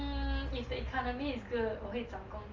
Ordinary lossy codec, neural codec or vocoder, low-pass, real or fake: Opus, 32 kbps; none; 7.2 kHz; real